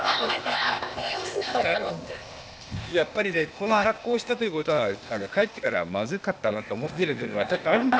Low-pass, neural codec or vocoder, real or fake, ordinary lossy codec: none; codec, 16 kHz, 0.8 kbps, ZipCodec; fake; none